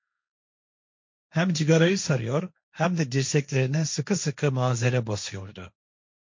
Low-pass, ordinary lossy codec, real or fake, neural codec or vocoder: 7.2 kHz; MP3, 48 kbps; fake; codec, 16 kHz, 1.1 kbps, Voila-Tokenizer